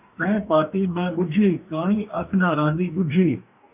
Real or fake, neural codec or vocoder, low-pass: fake; codec, 44.1 kHz, 2.6 kbps, DAC; 3.6 kHz